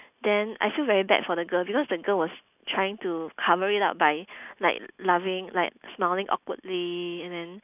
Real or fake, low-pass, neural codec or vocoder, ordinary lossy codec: real; 3.6 kHz; none; none